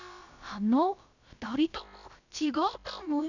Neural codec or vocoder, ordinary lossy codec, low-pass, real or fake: codec, 16 kHz, about 1 kbps, DyCAST, with the encoder's durations; none; 7.2 kHz; fake